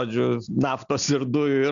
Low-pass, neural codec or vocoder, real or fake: 7.2 kHz; none; real